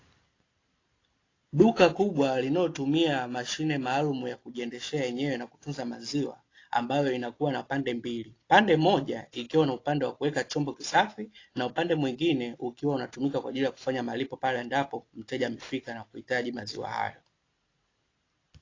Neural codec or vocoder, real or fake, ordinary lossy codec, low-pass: none; real; AAC, 32 kbps; 7.2 kHz